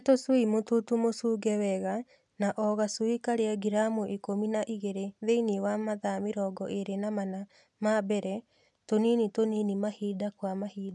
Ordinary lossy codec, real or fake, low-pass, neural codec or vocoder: none; real; 10.8 kHz; none